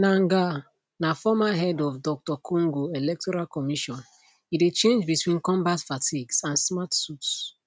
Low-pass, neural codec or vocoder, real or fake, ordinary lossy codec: none; none; real; none